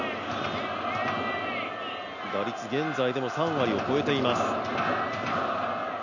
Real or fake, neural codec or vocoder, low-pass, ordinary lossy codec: real; none; 7.2 kHz; none